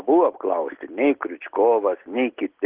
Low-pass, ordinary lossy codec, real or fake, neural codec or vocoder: 3.6 kHz; Opus, 16 kbps; real; none